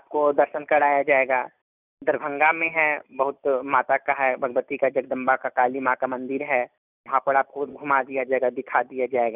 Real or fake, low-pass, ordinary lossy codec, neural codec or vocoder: real; 3.6 kHz; none; none